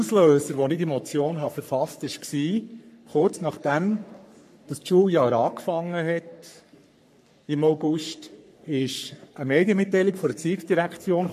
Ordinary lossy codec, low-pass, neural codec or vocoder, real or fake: MP3, 64 kbps; 14.4 kHz; codec, 44.1 kHz, 3.4 kbps, Pupu-Codec; fake